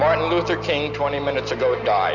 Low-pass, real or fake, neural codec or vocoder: 7.2 kHz; real; none